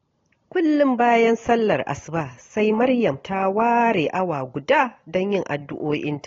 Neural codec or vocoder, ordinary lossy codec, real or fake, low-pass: none; AAC, 32 kbps; real; 7.2 kHz